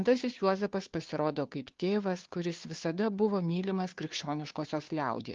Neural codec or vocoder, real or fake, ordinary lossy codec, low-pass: codec, 16 kHz, 2 kbps, FunCodec, trained on Chinese and English, 25 frames a second; fake; Opus, 32 kbps; 7.2 kHz